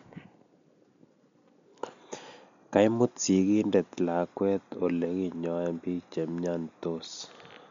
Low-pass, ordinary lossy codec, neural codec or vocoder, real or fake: 7.2 kHz; MP3, 64 kbps; none; real